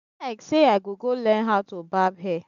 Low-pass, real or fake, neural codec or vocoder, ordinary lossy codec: 7.2 kHz; real; none; AAC, 96 kbps